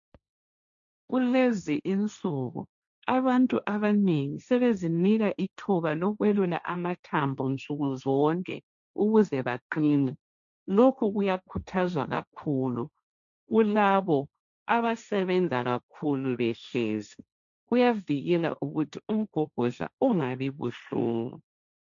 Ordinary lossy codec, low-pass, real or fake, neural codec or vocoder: MP3, 96 kbps; 7.2 kHz; fake; codec, 16 kHz, 1.1 kbps, Voila-Tokenizer